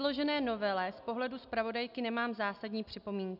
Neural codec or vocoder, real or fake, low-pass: none; real; 5.4 kHz